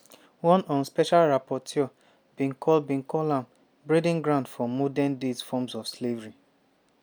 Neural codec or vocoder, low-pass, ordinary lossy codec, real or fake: none; none; none; real